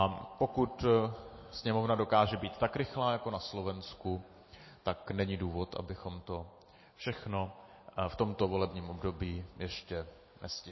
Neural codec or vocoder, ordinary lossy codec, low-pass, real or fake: none; MP3, 24 kbps; 7.2 kHz; real